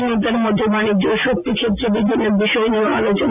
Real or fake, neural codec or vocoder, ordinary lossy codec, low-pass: real; none; AAC, 24 kbps; 3.6 kHz